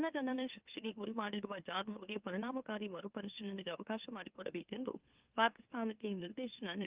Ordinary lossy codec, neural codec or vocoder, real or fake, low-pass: Opus, 24 kbps; autoencoder, 44.1 kHz, a latent of 192 numbers a frame, MeloTTS; fake; 3.6 kHz